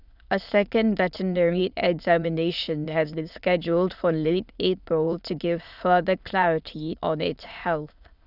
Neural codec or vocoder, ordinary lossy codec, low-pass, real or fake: autoencoder, 22.05 kHz, a latent of 192 numbers a frame, VITS, trained on many speakers; none; 5.4 kHz; fake